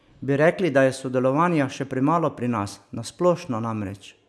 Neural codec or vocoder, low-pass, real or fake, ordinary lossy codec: none; none; real; none